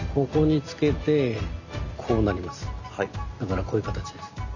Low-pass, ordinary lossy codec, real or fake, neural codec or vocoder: 7.2 kHz; none; real; none